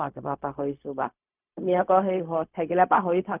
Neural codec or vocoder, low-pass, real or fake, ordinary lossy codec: codec, 16 kHz, 0.4 kbps, LongCat-Audio-Codec; 3.6 kHz; fake; none